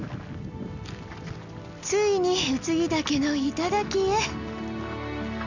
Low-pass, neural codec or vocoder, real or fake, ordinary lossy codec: 7.2 kHz; none; real; none